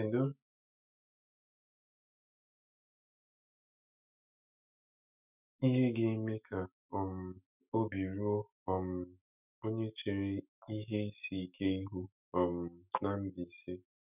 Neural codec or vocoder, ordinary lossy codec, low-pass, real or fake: autoencoder, 48 kHz, 128 numbers a frame, DAC-VAE, trained on Japanese speech; none; 3.6 kHz; fake